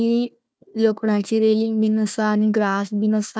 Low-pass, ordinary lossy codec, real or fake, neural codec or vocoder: none; none; fake; codec, 16 kHz, 1 kbps, FunCodec, trained on Chinese and English, 50 frames a second